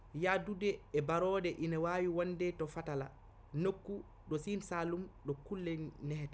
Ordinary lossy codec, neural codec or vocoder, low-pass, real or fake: none; none; none; real